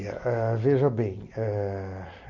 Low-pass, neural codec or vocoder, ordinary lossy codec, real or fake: 7.2 kHz; none; Opus, 64 kbps; real